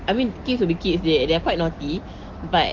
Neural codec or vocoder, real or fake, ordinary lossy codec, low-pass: none; real; Opus, 16 kbps; 7.2 kHz